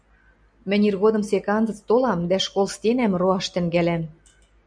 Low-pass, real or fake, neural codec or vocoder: 9.9 kHz; real; none